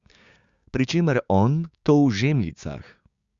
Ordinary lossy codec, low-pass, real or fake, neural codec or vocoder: Opus, 64 kbps; 7.2 kHz; fake; codec, 16 kHz, 6 kbps, DAC